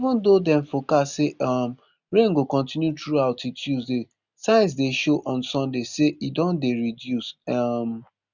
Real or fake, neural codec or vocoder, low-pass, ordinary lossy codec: real; none; 7.2 kHz; none